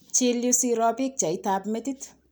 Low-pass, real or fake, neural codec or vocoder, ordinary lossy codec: none; real; none; none